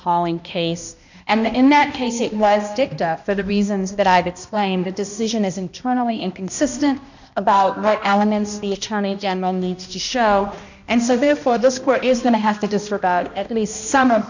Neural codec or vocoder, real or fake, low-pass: codec, 16 kHz, 1 kbps, X-Codec, HuBERT features, trained on balanced general audio; fake; 7.2 kHz